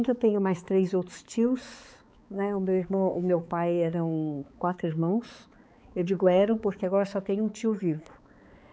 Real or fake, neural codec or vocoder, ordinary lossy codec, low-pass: fake; codec, 16 kHz, 4 kbps, X-Codec, HuBERT features, trained on balanced general audio; none; none